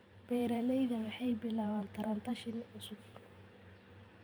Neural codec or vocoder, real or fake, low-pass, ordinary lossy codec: vocoder, 44.1 kHz, 128 mel bands every 512 samples, BigVGAN v2; fake; none; none